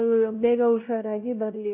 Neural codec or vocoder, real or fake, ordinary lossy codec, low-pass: codec, 16 kHz, 0.5 kbps, X-Codec, WavLM features, trained on Multilingual LibriSpeech; fake; none; 3.6 kHz